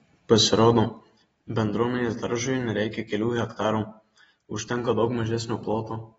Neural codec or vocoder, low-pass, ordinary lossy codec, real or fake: none; 19.8 kHz; AAC, 24 kbps; real